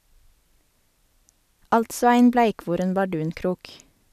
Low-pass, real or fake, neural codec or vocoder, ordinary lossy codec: 14.4 kHz; real; none; none